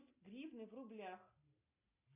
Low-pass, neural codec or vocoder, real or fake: 3.6 kHz; none; real